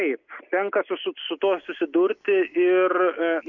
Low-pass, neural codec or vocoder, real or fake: 7.2 kHz; none; real